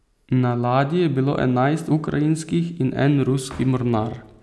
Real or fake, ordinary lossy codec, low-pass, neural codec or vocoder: real; none; none; none